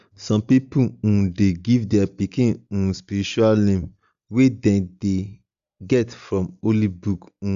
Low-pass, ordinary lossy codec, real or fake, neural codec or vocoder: 7.2 kHz; Opus, 64 kbps; real; none